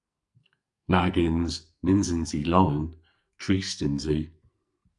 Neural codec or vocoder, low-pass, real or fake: codec, 44.1 kHz, 2.6 kbps, SNAC; 10.8 kHz; fake